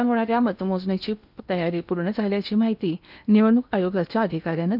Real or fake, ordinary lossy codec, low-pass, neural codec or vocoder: fake; none; 5.4 kHz; codec, 16 kHz in and 24 kHz out, 0.8 kbps, FocalCodec, streaming, 65536 codes